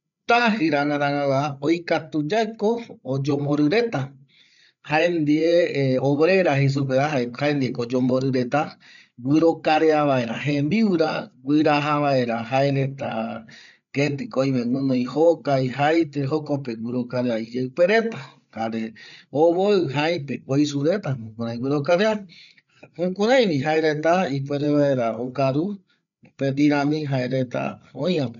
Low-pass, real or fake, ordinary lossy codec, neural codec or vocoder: 7.2 kHz; fake; none; codec, 16 kHz, 8 kbps, FreqCodec, larger model